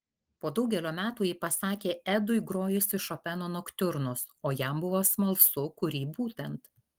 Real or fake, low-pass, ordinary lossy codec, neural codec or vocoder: real; 19.8 kHz; Opus, 24 kbps; none